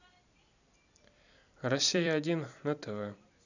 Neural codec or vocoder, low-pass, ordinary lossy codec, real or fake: vocoder, 44.1 kHz, 128 mel bands every 256 samples, BigVGAN v2; 7.2 kHz; none; fake